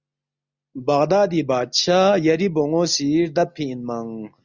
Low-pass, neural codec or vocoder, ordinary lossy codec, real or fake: 7.2 kHz; none; Opus, 64 kbps; real